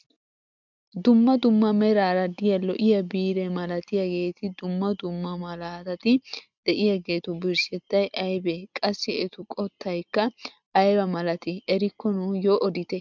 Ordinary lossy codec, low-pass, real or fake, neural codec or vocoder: MP3, 64 kbps; 7.2 kHz; real; none